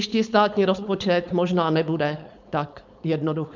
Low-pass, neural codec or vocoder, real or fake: 7.2 kHz; codec, 16 kHz, 4.8 kbps, FACodec; fake